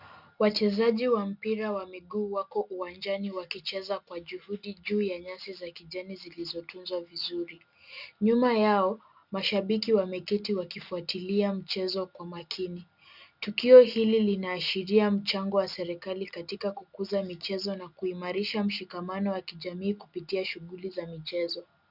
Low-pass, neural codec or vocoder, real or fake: 5.4 kHz; none; real